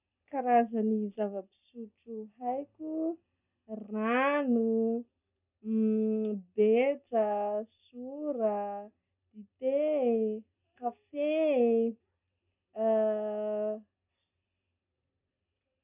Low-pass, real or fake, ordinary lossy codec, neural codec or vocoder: 3.6 kHz; real; none; none